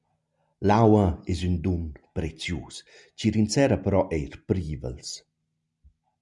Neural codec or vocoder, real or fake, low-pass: none; real; 10.8 kHz